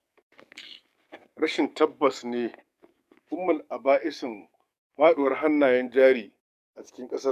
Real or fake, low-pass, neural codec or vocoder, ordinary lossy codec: fake; 14.4 kHz; codec, 44.1 kHz, 7.8 kbps, DAC; none